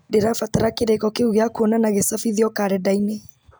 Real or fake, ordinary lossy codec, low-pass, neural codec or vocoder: real; none; none; none